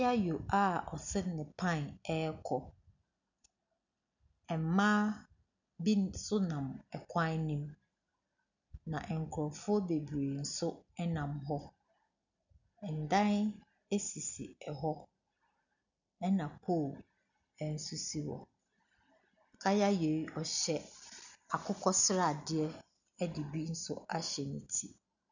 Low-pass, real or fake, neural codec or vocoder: 7.2 kHz; real; none